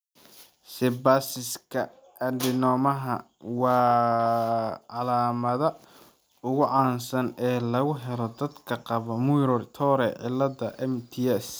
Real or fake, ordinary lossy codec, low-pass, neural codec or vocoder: real; none; none; none